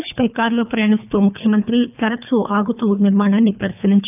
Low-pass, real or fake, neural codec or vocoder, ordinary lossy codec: 3.6 kHz; fake; codec, 24 kHz, 3 kbps, HILCodec; none